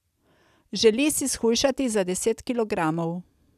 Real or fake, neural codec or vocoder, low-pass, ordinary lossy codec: real; none; 14.4 kHz; none